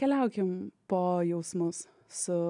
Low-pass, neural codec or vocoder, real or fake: 10.8 kHz; none; real